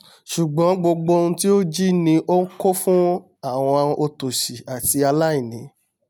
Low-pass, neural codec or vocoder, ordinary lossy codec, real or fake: none; none; none; real